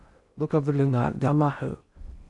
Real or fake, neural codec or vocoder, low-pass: fake; codec, 16 kHz in and 24 kHz out, 0.6 kbps, FocalCodec, streaming, 2048 codes; 10.8 kHz